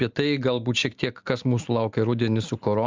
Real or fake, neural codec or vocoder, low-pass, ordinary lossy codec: real; none; 7.2 kHz; Opus, 32 kbps